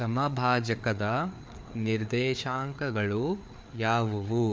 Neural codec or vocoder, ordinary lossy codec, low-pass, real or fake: codec, 16 kHz, 4 kbps, FreqCodec, larger model; none; none; fake